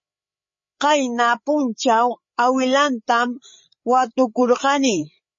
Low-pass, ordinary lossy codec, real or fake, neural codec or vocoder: 7.2 kHz; MP3, 32 kbps; fake; codec, 16 kHz, 8 kbps, FreqCodec, larger model